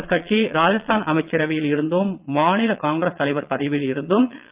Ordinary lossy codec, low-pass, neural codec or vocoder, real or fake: Opus, 24 kbps; 3.6 kHz; vocoder, 22.05 kHz, 80 mel bands, Vocos; fake